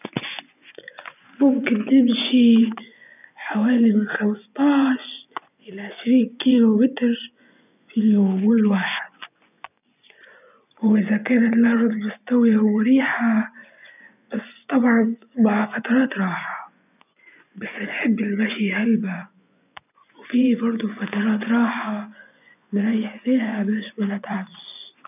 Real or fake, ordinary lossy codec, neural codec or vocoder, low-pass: fake; none; vocoder, 24 kHz, 100 mel bands, Vocos; 3.6 kHz